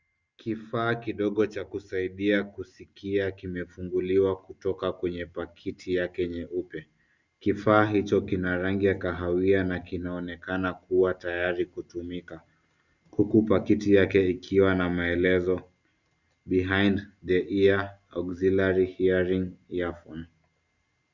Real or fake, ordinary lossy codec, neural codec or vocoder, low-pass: real; Opus, 64 kbps; none; 7.2 kHz